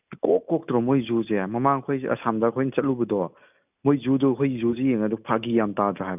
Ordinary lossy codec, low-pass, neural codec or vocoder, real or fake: none; 3.6 kHz; none; real